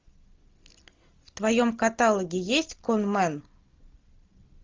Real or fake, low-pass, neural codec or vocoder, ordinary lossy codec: real; 7.2 kHz; none; Opus, 32 kbps